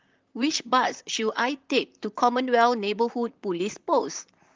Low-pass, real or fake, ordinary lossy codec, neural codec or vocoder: 7.2 kHz; fake; Opus, 32 kbps; vocoder, 44.1 kHz, 128 mel bands, Pupu-Vocoder